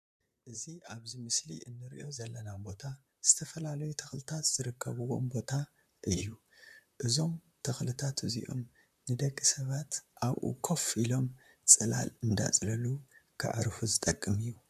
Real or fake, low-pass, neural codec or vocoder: fake; 14.4 kHz; vocoder, 44.1 kHz, 128 mel bands, Pupu-Vocoder